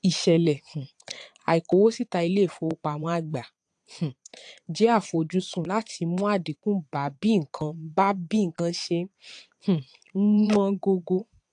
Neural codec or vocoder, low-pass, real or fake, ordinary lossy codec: vocoder, 22.05 kHz, 80 mel bands, Vocos; 9.9 kHz; fake; AAC, 64 kbps